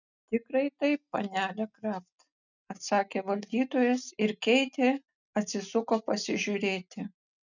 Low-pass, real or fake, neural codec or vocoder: 7.2 kHz; real; none